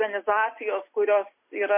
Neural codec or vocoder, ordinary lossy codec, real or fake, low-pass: none; MP3, 24 kbps; real; 3.6 kHz